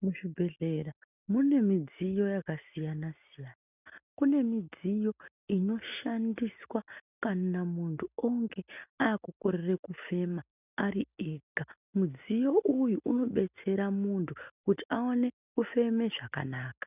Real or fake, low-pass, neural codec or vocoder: real; 3.6 kHz; none